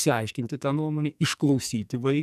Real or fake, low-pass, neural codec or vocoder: fake; 14.4 kHz; codec, 44.1 kHz, 2.6 kbps, SNAC